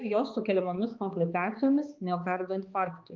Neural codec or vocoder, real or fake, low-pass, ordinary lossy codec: codec, 16 kHz, 2 kbps, X-Codec, HuBERT features, trained on balanced general audio; fake; 7.2 kHz; Opus, 32 kbps